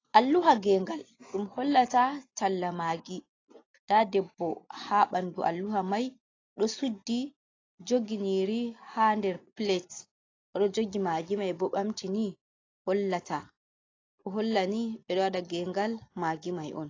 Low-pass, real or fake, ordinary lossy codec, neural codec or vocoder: 7.2 kHz; real; AAC, 32 kbps; none